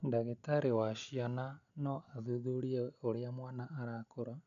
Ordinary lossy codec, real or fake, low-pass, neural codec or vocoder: none; real; 7.2 kHz; none